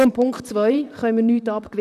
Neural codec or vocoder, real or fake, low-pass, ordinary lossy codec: vocoder, 44.1 kHz, 128 mel bands, Pupu-Vocoder; fake; 14.4 kHz; none